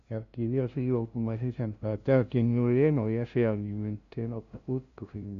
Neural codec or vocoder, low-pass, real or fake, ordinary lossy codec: codec, 16 kHz, 0.5 kbps, FunCodec, trained on LibriTTS, 25 frames a second; 7.2 kHz; fake; none